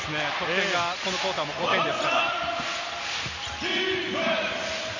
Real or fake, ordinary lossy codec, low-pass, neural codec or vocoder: real; AAC, 48 kbps; 7.2 kHz; none